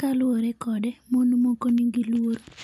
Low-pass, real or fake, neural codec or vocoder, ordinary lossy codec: 14.4 kHz; real; none; none